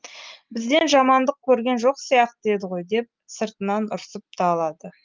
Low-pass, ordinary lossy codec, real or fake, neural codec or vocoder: 7.2 kHz; Opus, 32 kbps; real; none